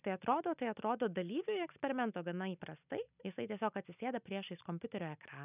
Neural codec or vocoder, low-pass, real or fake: none; 3.6 kHz; real